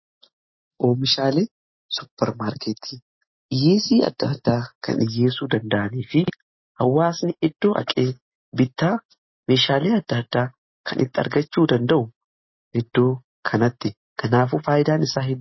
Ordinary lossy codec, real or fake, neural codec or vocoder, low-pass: MP3, 24 kbps; real; none; 7.2 kHz